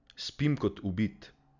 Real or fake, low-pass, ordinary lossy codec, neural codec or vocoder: real; 7.2 kHz; none; none